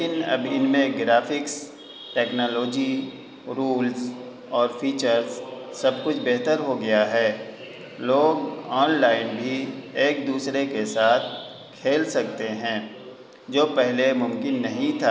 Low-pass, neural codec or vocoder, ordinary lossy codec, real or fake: none; none; none; real